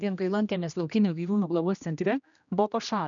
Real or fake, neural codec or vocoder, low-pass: fake; codec, 16 kHz, 1 kbps, X-Codec, HuBERT features, trained on general audio; 7.2 kHz